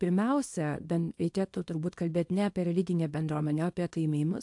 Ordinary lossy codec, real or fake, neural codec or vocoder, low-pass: AAC, 64 kbps; fake; codec, 24 kHz, 0.9 kbps, WavTokenizer, small release; 10.8 kHz